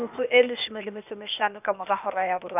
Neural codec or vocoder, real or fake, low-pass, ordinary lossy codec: codec, 16 kHz, 0.8 kbps, ZipCodec; fake; 3.6 kHz; none